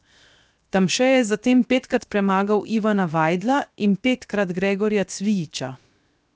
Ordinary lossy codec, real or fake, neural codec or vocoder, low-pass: none; fake; codec, 16 kHz, 0.7 kbps, FocalCodec; none